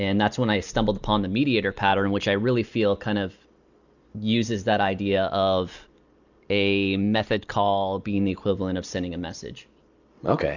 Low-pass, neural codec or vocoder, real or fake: 7.2 kHz; none; real